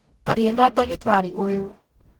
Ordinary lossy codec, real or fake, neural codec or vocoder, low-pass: Opus, 16 kbps; fake; codec, 44.1 kHz, 0.9 kbps, DAC; 19.8 kHz